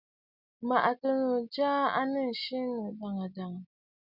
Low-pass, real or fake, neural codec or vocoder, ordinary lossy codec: 5.4 kHz; real; none; Opus, 64 kbps